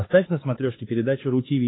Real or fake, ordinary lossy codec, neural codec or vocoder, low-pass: fake; AAC, 16 kbps; codec, 16 kHz, 4 kbps, X-Codec, WavLM features, trained on Multilingual LibriSpeech; 7.2 kHz